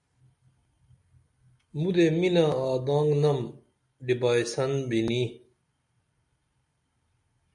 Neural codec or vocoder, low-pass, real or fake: none; 10.8 kHz; real